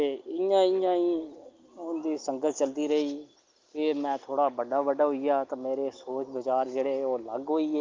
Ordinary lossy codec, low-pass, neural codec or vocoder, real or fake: Opus, 32 kbps; 7.2 kHz; none; real